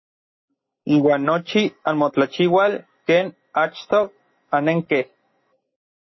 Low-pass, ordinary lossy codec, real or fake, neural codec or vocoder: 7.2 kHz; MP3, 24 kbps; real; none